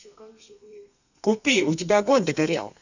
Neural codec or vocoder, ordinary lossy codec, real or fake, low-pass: codec, 32 kHz, 1.9 kbps, SNAC; none; fake; 7.2 kHz